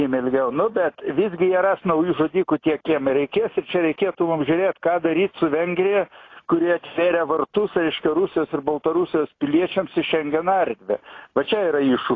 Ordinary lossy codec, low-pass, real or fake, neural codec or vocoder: AAC, 32 kbps; 7.2 kHz; real; none